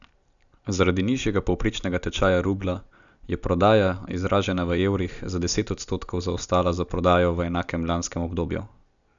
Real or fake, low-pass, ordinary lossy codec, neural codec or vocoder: real; 7.2 kHz; none; none